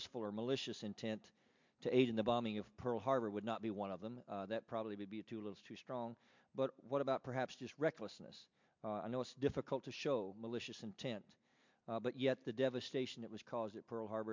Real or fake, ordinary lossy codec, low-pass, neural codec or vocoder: real; MP3, 64 kbps; 7.2 kHz; none